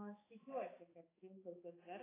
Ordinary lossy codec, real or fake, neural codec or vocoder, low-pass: AAC, 16 kbps; fake; codec, 16 kHz, 4 kbps, X-Codec, HuBERT features, trained on balanced general audio; 3.6 kHz